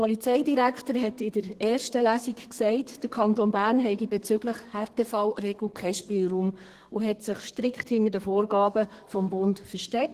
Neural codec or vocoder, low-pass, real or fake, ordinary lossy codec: codec, 32 kHz, 1.9 kbps, SNAC; 14.4 kHz; fake; Opus, 16 kbps